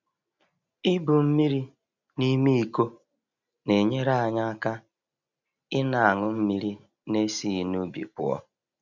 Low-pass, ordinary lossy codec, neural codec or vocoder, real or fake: 7.2 kHz; none; none; real